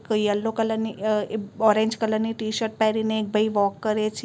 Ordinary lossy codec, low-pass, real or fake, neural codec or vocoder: none; none; real; none